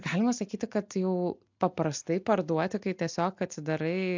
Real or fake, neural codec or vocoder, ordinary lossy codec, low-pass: real; none; MP3, 64 kbps; 7.2 kHz